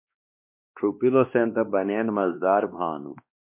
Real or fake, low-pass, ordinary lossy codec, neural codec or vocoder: fake; 3.6 kHz; MP3, 32 kbps; codec, 16 kHz, 2 kbps, X-Codec, WavLM features, trained on Multilingual LibriSpeech